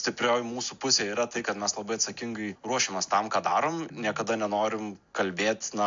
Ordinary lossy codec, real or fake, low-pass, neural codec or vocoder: AAC, 64 kbps; real; 7.2 kHz; none